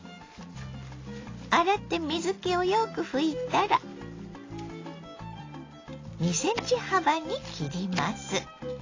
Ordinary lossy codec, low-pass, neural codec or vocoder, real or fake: AAC, 32 kbps; 7.2 kHz; none; real